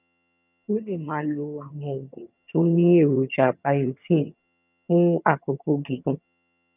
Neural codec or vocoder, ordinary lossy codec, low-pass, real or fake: vocoder, 22.05 kHz, 80 mel bands, HiFi-GAN; none; 3.6 kHz; fake